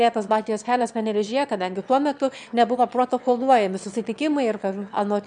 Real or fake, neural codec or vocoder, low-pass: fake; autoencoder, 22.05 kHz, a latent of 192 numbers a frame, VITS, trained on one speaker; 9.9 kHz